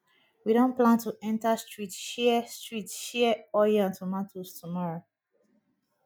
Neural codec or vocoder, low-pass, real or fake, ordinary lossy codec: none; none; real; none